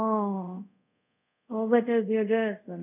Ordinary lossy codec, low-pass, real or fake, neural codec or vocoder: none; 3.6 kHz; fake; codec, 24 kHz, 0.5 kbps, DualCodec